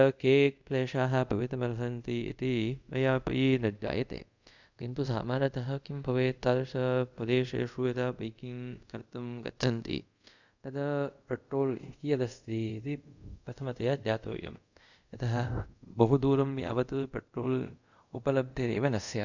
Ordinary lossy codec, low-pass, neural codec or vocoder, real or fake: none; 7.2 kHz; codec, 24 kHz, 0.5 kbps, DualCodec; fake